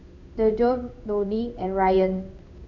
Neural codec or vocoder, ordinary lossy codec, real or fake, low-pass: codec, 16 kHz in and 24 kHz out, 1 kbps, XY-Tokenizer; none; fake; 7.2 kHz